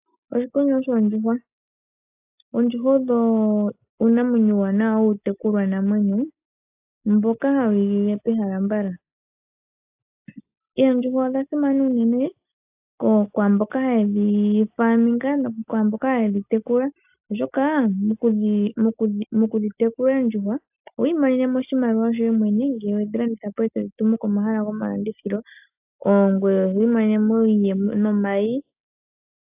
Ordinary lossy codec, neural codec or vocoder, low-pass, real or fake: AAC, 32 kbps; none; 3.6 kHz; real